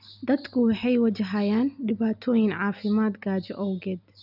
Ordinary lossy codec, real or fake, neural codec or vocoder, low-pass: none; real; none; 5.4 kHz